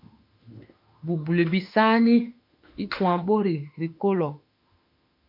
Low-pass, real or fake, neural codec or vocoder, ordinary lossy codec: 5.4 kHz; fake; autoencoder, 48 kHz, 32 numbers a frame, DAC-VAE, trained on Japanese speech; MP3, 48 kbps